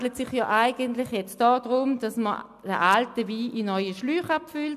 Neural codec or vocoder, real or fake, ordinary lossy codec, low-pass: none; real; AAC, 96 kbps; 14.4 kHz